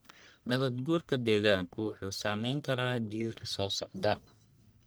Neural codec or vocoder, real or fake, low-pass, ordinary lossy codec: codec, 44.1 kHz, 1.7 kbps, Pupu-Codec; fake; none; none